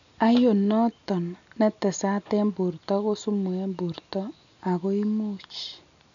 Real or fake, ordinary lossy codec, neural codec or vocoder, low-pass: real; none; none; 7.2 kHz